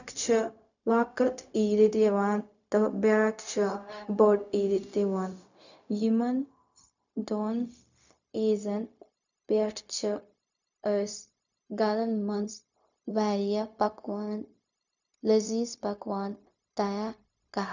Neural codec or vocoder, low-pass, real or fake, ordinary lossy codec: codec, 16 kHz, 0.4 kbps, LongCat-Audio-Codec; 7.2 kHz; fake; none